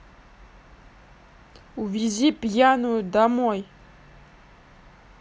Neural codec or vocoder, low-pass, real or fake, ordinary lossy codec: none; none; real; none